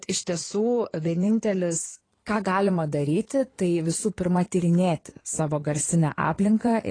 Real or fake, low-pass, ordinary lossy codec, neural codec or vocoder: fake; 9.9 kHz; AAC, 32 kbps; codec, 16 kHz in and 24 kHz out, 2.2 kbps, FireRedTTS-2 codec